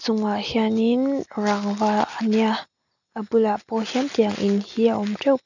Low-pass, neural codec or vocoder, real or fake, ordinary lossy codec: 7.2 kHz; none; real; none